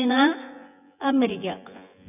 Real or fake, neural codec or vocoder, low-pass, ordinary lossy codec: fake; vocoder, 24 kHz, 100 mel bands, Vocos; 3.6 kHz; none